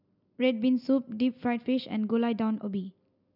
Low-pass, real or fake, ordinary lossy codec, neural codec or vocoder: 5.4 kHz; real; none; none